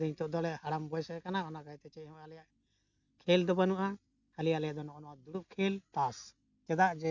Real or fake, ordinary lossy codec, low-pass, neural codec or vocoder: real; none; 7.2 kHz; none